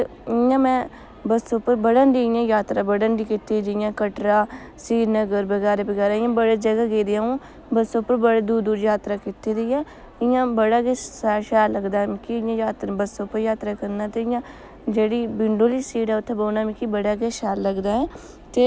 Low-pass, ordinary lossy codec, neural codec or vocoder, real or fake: none; none; none; real